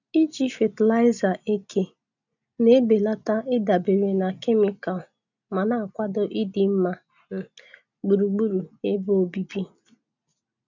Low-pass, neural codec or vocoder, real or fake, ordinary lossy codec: 7.2 kHz; none; real; none